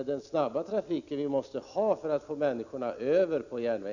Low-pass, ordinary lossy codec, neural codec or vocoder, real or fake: 7.2 kHz; none; none; real